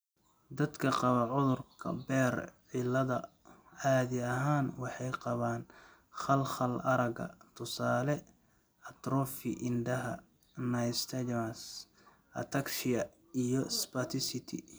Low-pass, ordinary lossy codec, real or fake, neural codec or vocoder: none; none; real; none